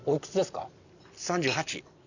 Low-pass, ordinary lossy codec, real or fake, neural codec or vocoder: 7.2 kHz; none; real; none